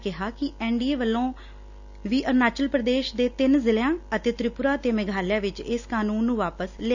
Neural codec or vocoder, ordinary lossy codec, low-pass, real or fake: none; none; 7.2 kHz; real